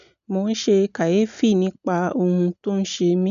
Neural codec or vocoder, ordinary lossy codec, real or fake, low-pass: none; none; real; 7.2 kHz